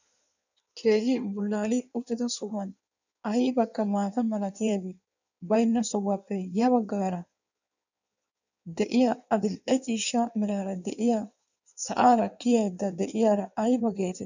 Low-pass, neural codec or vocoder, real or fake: 7.2 kHz; codec, 16 kHz in and 24 kHz out, 1.1 kbps, FireRedTTS-2 codec; fake